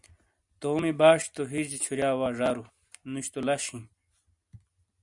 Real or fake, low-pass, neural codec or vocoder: real; 10.8 kHz; none